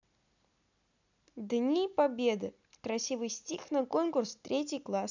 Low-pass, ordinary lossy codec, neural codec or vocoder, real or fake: 7.2 kHz; none; none; real